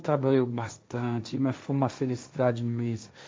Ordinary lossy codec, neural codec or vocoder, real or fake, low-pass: none; codec, 16 kHz, 1.1 kbps, Voila-Tokenizer; fake; none